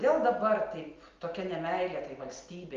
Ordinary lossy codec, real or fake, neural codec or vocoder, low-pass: Opus, 32 kbps; real; none; 7.2 kHz